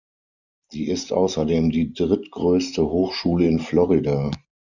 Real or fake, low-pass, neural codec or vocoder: real; 7.2 kHz; none